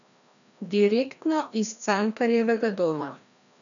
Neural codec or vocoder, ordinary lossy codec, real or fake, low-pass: codec, 16 kHz, 1 kbps, FreqCodec, larger model; none; fake; 7.2 kHz